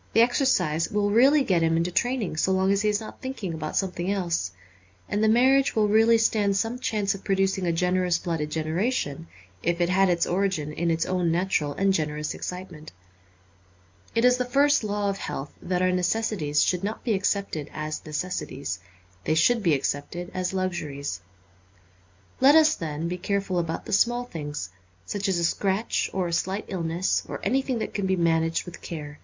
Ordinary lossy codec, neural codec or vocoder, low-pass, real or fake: MP3, 64 kbps; none; 7.2 kHz; real